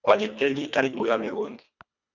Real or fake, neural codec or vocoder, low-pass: fake; codec, 24 kHz, 1.5 kbps, HILCodec; 7.2 kHz